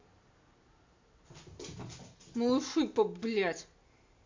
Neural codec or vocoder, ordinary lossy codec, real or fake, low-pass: none; MP3, 48 kbps; real; 7.2 kHz